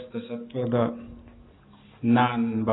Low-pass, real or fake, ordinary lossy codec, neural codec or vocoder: 7.2 kHz; real; AAC, 16 kbps; none